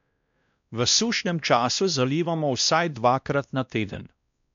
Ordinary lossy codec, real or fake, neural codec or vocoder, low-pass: MP3, 64 kbps; fake; codec, 16 kHz, 1 kbps, X-Codec, WavLM features, trained on Multilingual LibriSpeech; 7.2 kHz